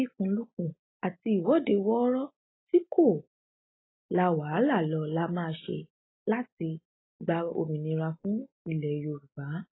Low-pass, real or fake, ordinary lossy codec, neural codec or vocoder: 7.2 kHz; real; AAC, 16 kbps; none